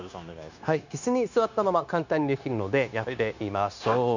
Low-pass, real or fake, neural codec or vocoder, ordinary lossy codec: 7.2 kHz; fake; codec, 16 kHz, 0.9 kbps, LongCat-Audio-Codec; none